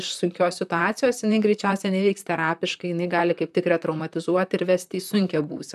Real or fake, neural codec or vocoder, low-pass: fake; vocoder, 44.1 kHz, 128 mel bands, Pupu-Vocoder; 14.4 kHz